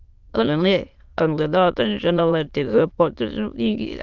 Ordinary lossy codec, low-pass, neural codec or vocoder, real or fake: Opus, 32 kbps; 7.2 kHz; autoencoder, 22.05 kHz, a latent of 192 numbers a frame, VITS, trained on many speakers; fake